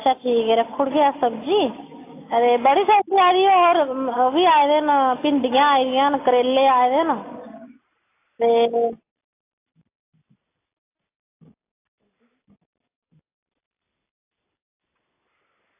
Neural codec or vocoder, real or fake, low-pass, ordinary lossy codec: none; real; 3.6 kHz; AAC, 24 kbps